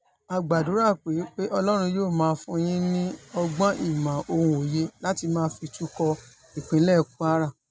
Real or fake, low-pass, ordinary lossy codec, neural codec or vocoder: real; none; none; none